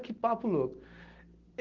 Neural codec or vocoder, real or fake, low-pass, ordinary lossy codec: none; real; 7.2 kHz; Opus, 16 kbps